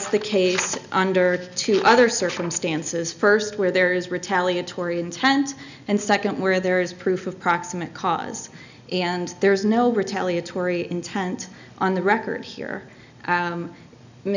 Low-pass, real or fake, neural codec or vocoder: 7.2 kHz; real; none